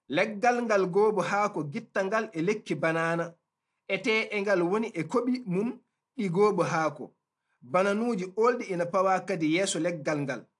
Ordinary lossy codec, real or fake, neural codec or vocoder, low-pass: AAC, 64 kbps; real; none; 10.8 kHz